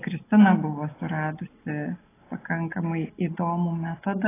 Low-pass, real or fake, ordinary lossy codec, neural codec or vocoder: 3.6 kHz; real; AAC, 16 kbps; none